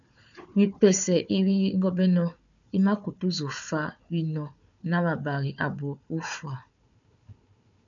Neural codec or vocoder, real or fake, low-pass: codec, 16 kHz, 4 kbps, FunCodec, trained on Chinese and English, 50 frames a second; fake; 7.2 kHz